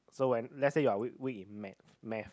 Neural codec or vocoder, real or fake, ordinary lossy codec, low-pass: none; real; none; none